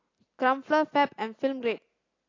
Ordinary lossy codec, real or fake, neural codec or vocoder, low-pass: AAC, 32 kbps; real; none; 7.2 kHz